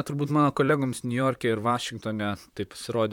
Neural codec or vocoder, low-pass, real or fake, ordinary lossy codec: vocoder, 44.1 kHz, 128 mel bands, Pupu-Vocoder; 19.8 kHz; fake; MP3, 96 kbps